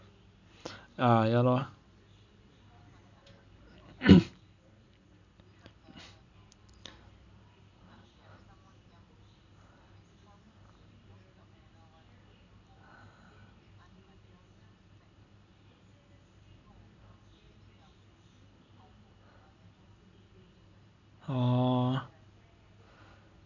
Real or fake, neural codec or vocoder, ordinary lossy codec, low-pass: real; none; none; 7.2 kHz